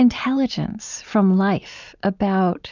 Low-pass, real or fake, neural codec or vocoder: 7.2 kHz; real; none